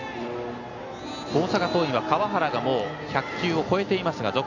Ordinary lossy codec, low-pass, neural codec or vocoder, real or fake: none; 7.2 kHz; none; real